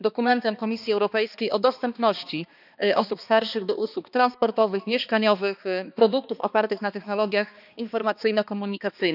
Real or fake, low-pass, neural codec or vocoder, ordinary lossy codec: fake; 5.4 kHz; codec, 16 kHz, 2 kbps, X-Codec, HuBERT features, trained on balanced general audio; none